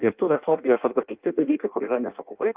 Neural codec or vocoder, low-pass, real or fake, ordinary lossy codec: codec, 16 kHz in and 24 kHz out, 0.6 kbps, FireRedTTS-2 codec; 3.6 kHz; fake; Opus, 32 kbps